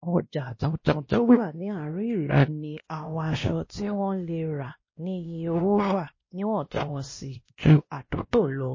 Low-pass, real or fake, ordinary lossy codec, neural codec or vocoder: 7.2 kHz; fake; MP3, 32 kbps; codec, 16 kHz, 1 kbps, X-Codec, WavLM features, trained on Multilingual LibriSpeech